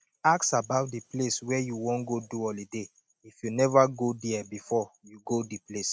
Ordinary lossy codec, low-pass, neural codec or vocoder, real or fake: none; none; none; real